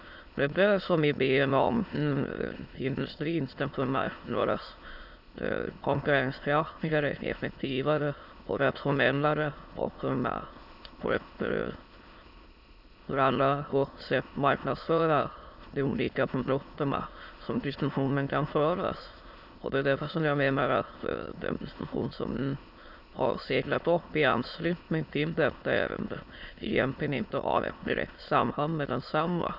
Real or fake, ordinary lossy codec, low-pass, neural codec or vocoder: fake; Opus, 64 kbps; 5.4 kHz; autoencoder, 22.05 kHz, a latent of 192 numbers a frame, VITS, trained on many speakers